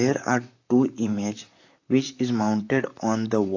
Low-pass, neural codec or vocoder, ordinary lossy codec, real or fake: 7.2 kHz; vocoder, 44.1 kHz, 128 mel bands, Pupu-Vocoder; AAC, 48 kbps; fake